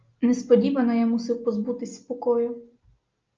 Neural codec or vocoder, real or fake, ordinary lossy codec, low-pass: none; real; Opus, 24 kbps; 7.2 kHz